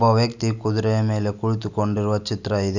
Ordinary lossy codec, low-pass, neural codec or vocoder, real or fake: none; 7.2 kHz; none; real